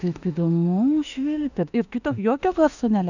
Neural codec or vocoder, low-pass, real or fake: autoencoder, 48 kHz, 32 numbers a frame, DAC-VAE, trained on Japanese speech; 7.2 kHz; fake